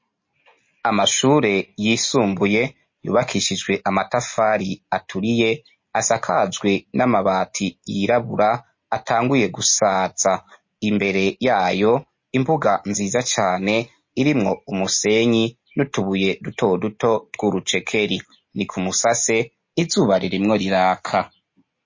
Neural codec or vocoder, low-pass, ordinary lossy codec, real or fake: none; 7.2 kHz; MP3, 32 kbps; real